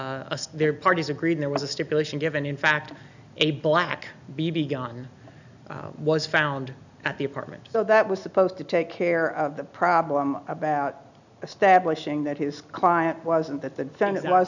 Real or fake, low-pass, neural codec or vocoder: real; 7.2 kHz; none